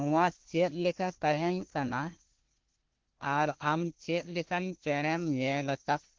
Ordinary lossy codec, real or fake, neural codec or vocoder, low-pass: Opus, 16 kbps; fake; codec, 16 kHz, 1 kbps, FunCodec, trained on Chinese and English, 50 frames a second; 7.2 kHz